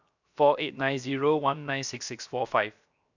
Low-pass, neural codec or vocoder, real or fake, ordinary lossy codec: 7.2 kHz; codec, 16 kHz, 0.7 kbps, FocalCodec; fake; none